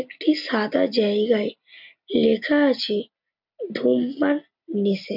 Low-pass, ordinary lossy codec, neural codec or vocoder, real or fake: 5.4 kHz; none; none; real